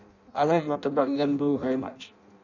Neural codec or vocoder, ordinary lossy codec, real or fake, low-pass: codec, 16 kHz in and 24 kHz out, 0.6 kbps, FireRedTTS-2 codec; none; fake; 7.2 kHz